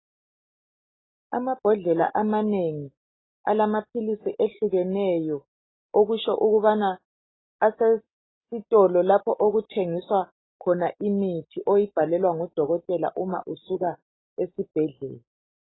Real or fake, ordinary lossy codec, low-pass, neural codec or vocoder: real; AAC, 16 kbps; 7.2 kHz; none